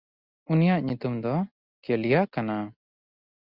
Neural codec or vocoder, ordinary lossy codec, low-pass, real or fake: none; Opus, 64 kbps; 5.4 kHz; real